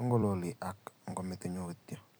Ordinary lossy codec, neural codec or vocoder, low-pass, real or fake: none; vocoder, 44.1 kHz, 128 mel bands every 256 samples, BigVGAN v2; none; fake